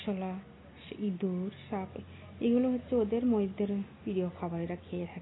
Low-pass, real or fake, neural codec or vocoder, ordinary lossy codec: 7.2 kHz; real; none; AAC, 16 kbps